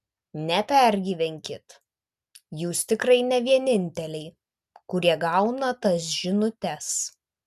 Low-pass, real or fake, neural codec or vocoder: 14.4 kHz; real; none